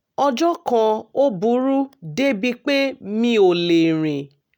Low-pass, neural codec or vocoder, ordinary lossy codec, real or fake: 19.8 kHz; none; none; real